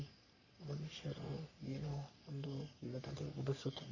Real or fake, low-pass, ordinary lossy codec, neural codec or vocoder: fake; 7.2 kHz; none; codec, 44.1 kHz, 3.4 kbps, Pupu-Codec